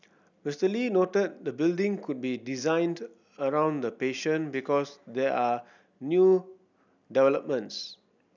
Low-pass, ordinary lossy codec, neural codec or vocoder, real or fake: 7.2 kHz; none; none; real